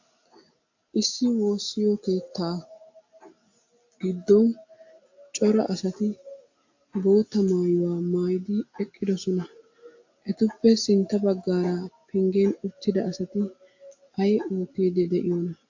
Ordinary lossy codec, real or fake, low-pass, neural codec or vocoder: AAC, 48 kbps; real; 7.2 kHz; none